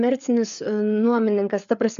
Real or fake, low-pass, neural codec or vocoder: fake; 7.2 kHz; codec, 16 kHz, 4 kbps, FreqCodec, larger model